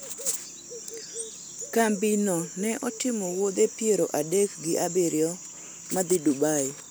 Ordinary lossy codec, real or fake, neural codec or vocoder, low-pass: none; real; none; none